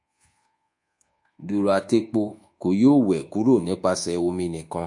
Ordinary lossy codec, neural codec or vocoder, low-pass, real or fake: MP3, 48 kbps; codec, 24 kHz, 1.2 kbps, DualCodec; 10.8 kHz; fake